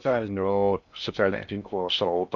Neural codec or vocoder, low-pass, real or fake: codec, 16 kHz in and 24 kHz out, 0.6 kbps, FocalCodec, streaming, 4096 codes; 7.2 kHz; fake